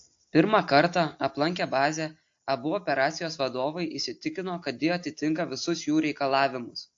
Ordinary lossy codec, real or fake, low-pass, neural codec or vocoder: AAC, 48 kbps; real; 7.2 kHz; none